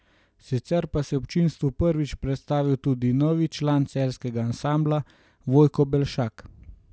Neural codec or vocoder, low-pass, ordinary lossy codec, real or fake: none; none; none; real